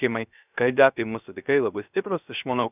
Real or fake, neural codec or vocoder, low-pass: fake; codec, 16 kHz, 0.3 kbps, FocalCodec; 3.6 kHz